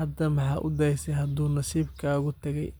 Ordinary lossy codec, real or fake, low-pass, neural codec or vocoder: none; real; none; none